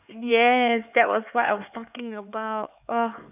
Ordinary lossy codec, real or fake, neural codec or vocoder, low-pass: none; fake; codec, 16 kHz, 4 kbps, X-Codec, HuBERT features, trained on LibriSpeech; 3.6 kHz